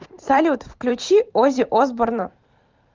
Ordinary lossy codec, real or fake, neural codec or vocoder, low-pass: Opus, 24 kbps; fake; vocoder, 44.1 kHz, 128 mel bands every 512 samples, BigVGAN v2; 7.2 kHz